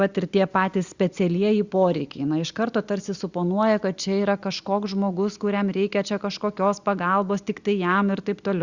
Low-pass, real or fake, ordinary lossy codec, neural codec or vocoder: 7.2 kHz; real; Opus, 64 kbps; none